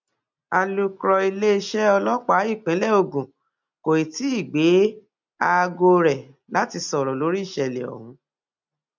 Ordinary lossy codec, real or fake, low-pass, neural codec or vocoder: MP3, 64 kbps; real; 7.2 kHz; none